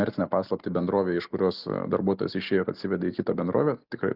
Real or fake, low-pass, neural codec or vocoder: real; 5.4 kHz; none